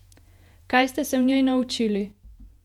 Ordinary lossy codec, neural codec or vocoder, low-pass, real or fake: none; vocoder, 48 kHz, 128 mel bands, Vocos; 19.8 kHz; fake